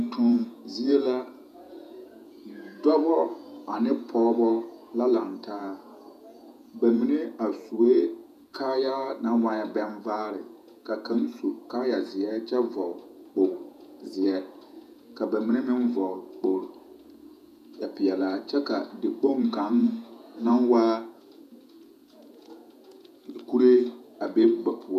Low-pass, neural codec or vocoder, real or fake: 14.4 kHz; vocoder, 48 kHz, 128 mel bands, Vocos; fake